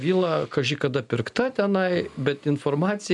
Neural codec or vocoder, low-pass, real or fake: none; 10.8 kHz; real